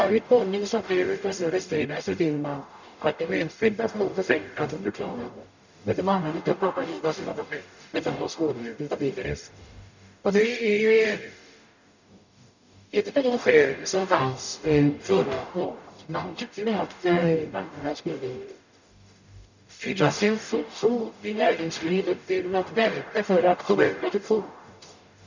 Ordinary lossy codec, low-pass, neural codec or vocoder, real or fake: none; 7.2 kHz; codec, 44.1 kHz, 0.9 kbps, DAC; fake